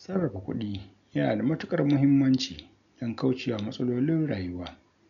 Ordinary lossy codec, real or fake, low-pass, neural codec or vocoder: none; real; 7.2 kHz; none